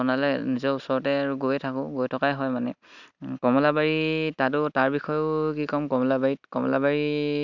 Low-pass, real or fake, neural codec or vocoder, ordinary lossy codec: 7.2 kHz; real; none; none